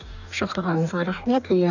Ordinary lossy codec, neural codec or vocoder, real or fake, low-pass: none; codec, 44.1 kHz, 2.6 kbps, DAC; fake; 7.2 kHz